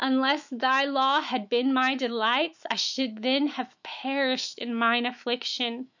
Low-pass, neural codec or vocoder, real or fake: 7.2 kHz; autoencoder, 48 kHz, 128 numbers a frame, DAC-VAE, trained on Japanese speech; fake